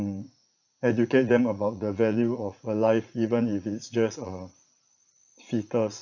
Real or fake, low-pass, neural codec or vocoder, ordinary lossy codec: fake; 7.2 kHz; vocoder, 44.1 kHz, 80 mel bands, Vocos; none